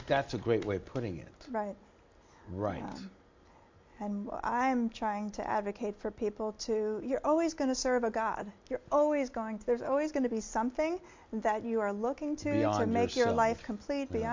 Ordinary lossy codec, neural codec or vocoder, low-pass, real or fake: MP3, 48 kbps; none; 7.2 kHz; real